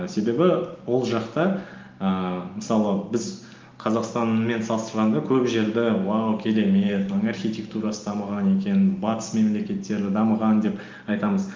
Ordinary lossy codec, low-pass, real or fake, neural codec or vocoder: Opus, 32 kbps; 7.2 kHz; real; none